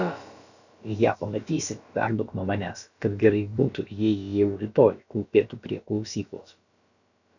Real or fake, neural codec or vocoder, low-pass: fake; codec, 16 kHz, about 1 kbps, DyCAST, with the encoder's durations; 7.2 kHz